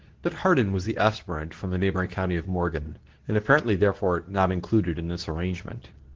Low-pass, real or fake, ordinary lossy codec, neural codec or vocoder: 7.2 kHz; fake; Opus, 16 kbps; codec, 24 kHz, 0.9 kbps, WavTokenizer, medium speech release version 2